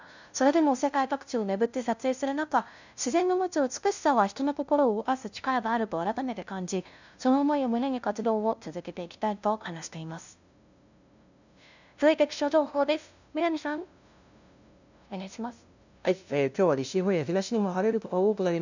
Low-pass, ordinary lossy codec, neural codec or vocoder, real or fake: 7.2 kHz; none; codec, 16 kHz, 0.5 kbps, FunCodec, trained on LibriTTS, 25 frames a second; fake